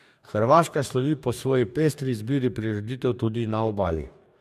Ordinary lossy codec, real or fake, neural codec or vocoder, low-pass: none; fake; codec, 44.1 kHz, 2.6 kbps, DAC; 14.4 kHz